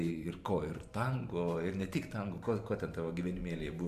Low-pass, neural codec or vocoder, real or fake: 14.4 kHz; vocoder, 44.1 kHz, 128 mel bands every 512 samples, BigVGAN v2; fake